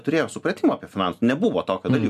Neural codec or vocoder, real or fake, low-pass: none; real; 14.4 kHz